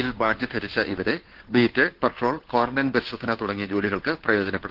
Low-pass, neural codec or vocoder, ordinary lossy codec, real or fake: 5.4 kHz; codec, 16 kHz, 2 kbps, FunCodec, trained on Chinese and English, 25 frames a second; Opus, 16 kbps; fake